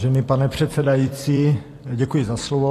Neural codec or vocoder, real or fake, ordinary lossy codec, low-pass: vocoder, 44.1 kHz, 128 mel bands every 256 samples, BigVGAN v2; fake; AAC, 48 kbps; 14.4 kHz